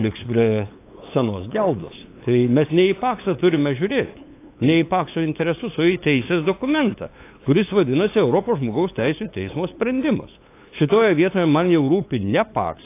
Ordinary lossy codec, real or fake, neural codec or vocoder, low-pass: AAC, 24 kbps; fake; codec, 16 kHz, 8 kbps, FunCodec, trained on LibriTTS, 25 frames a second; 3.6 kHz